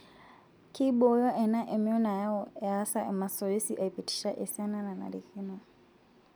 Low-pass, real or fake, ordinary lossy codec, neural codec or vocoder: none; real; none; none